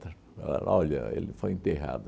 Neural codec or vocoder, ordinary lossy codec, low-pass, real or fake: none; none; none; real